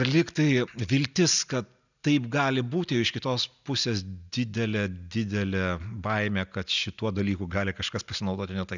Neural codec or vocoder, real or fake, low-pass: none; real; 7.2 kHz